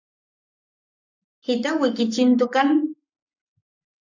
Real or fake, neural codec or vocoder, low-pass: fake; codec, 44.1 kHz, 7.8 kbps, Pupu-Codec; 7.2 kHz